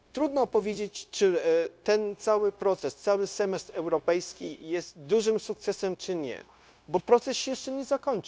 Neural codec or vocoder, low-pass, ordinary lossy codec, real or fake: codec, 16 kHz, 0.9 kbps, LongCat-Audio-Codec; none; none; fake